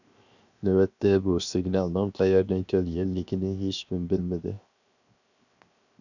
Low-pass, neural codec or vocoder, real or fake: 7.2 kHz; codec, 16 kHz, 0.7 kbps, FocalCodec; fake